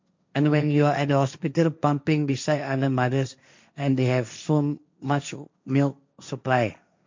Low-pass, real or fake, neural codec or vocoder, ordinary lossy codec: 7.2 kHz; fake; codec, 16 kHz, 1.1 kbps, Voila-Tokenizer; MP3, 64 kbps